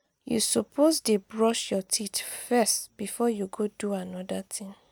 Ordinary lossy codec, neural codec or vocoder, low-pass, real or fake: none; none; none; real